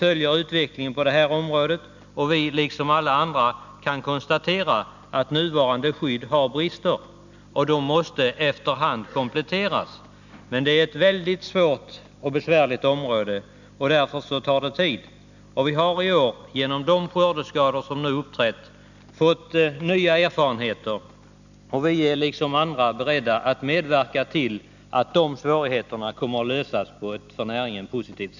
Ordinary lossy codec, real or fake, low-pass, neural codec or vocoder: none; real; 7.2 kHz; none